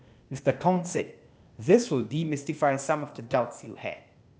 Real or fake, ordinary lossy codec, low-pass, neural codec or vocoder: fake; none; none; codec, 16 kHz, 0.8 kbps, ZipCodec